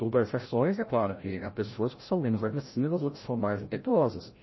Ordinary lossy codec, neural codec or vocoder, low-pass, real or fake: MP3, 24 kbps; codec, 16 kHz, 0.5 kbps, FreqCodec, larger model; 7.2 kHz; fake